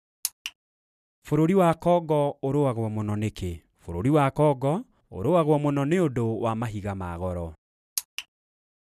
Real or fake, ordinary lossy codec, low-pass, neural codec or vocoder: real; none; 14.4 kHz; none